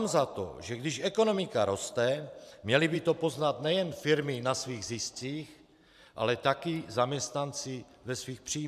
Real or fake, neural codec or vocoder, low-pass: fake; vocoder, 44.1 kHz, 128 mel bands every 512 samples, BigVGAN v2; 14.4 kHz